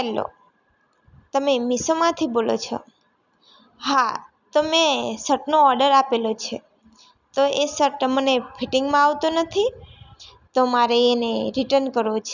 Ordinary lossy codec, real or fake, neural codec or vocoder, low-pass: none; real; none; 7.2 kHz